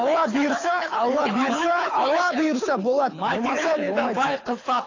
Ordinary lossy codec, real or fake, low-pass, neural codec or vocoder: AAC, 32 kbps; fake; 7.2 kHz; codec, 24 kHz, 6 kbps, HILCodec